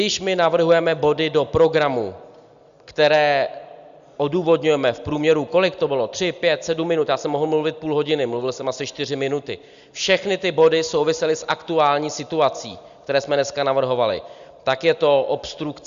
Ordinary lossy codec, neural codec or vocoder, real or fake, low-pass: Opus, 64 kbps; none; real; 7.2 kHz